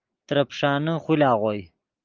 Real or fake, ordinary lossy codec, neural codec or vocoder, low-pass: real; Opus, 32 kbps; none; 7.2 kHz